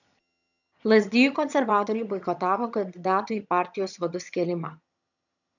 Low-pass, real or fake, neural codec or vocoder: 7.2 kHz; fake; vocoder, 22.05 kHz, 80 mel bands, HiFi-GAN